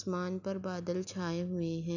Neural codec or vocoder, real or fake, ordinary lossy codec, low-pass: none; real; none; 7.2 kHz